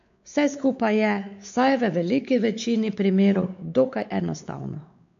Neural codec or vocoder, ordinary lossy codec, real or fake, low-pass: codec, 16 kHz, 4 kbps, X-Codec, WavLM features, trained on Multilingual LibriSpeech; AAC, 64 kbps; fake; 7.2 kHz